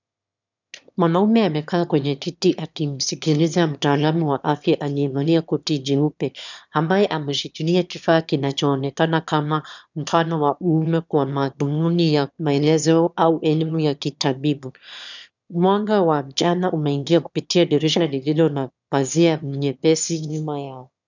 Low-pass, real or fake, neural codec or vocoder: 7.2 kHz; fake; autoencoder, 22.05 kHz, a latent of 192 numbers a frame, VITS, trained on one speaker